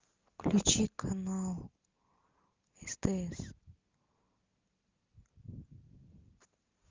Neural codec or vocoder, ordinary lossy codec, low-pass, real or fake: none; Opus, 24 kbps; 7.2 kHz; real